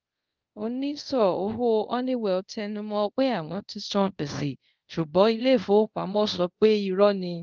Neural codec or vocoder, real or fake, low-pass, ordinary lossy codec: codec, 24 kHz, 0.5 kbps, DualCodec; fake; 7.2 kHz; Opus, 32 kbps